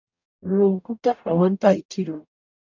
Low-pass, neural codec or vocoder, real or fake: 7.2 kHz; codec, 44.1 kHz, 0.9 kbps, DAC; fake